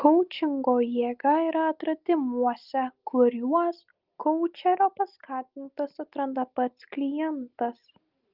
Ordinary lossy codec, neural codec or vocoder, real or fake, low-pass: Opus, 32 kbps; none; real; 5.4 kHz